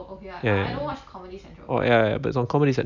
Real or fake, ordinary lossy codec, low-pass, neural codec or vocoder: real; none; 7.2 kHz; none